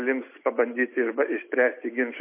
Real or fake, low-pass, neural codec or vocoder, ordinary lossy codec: real; 3.6 kHz; none; MP3, 24 kbps